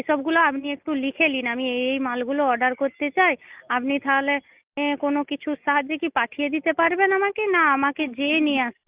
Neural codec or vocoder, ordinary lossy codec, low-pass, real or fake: none; Opus, 32 kbps; 3.6 kHz; real